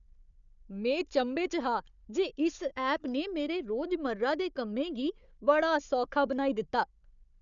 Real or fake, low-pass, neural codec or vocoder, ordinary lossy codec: fake; 7.2 kHz; codec, 16 kHz, 4 kbps, FunCodec, trained on Chinese and English, 50 frames a second; none